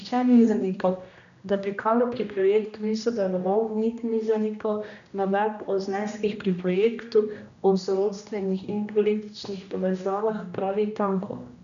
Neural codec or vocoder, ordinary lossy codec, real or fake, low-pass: codec, 16 kHz, 1 kbps, X-Codec, HuBERT features, trained on general audio; none; fake; 7.2 kHz